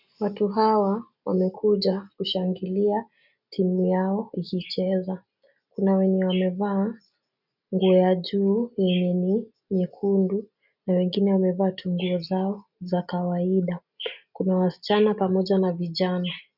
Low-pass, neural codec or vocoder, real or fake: 5.4 kHz; none; real